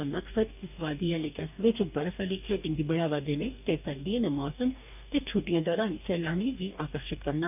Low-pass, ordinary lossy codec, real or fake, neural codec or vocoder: 3.6 kHz; none; fake; codec, 44.1 kHz, 2.6 kbps, DAC